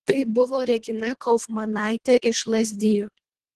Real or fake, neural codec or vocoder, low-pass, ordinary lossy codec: fake; codec, 24 kHz, 1.5 kbps, HILCodec; 10.8 kHz; Opus, 16 kbps